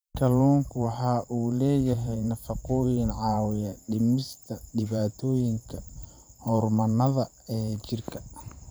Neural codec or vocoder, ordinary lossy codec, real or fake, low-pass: vocoder, 44.1 kHz, 128 mel bands every 512 samples, BigVGAN v2; none; fake; none